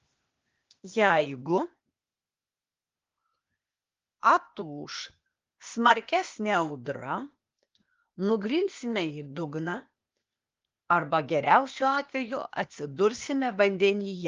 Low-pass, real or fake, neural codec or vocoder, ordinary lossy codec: 7.2 kHz; fake; codec, 16 kHz, 0.8 kbps, ZipCodec; Opus, 32 kbps